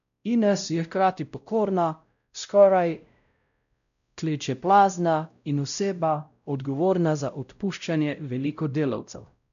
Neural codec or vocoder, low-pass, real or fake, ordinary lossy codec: codec, 16 kHz, 0.5 kbps, X-Codec, WavLM features, trained on Multilingual LibriSpeech; 7.2 kHz; fake; none